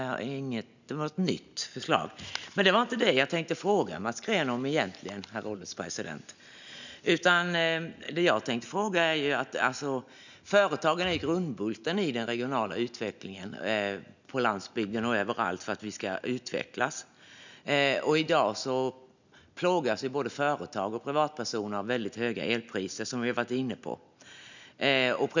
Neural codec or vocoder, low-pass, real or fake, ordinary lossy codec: none; 7.2 kHz; real; none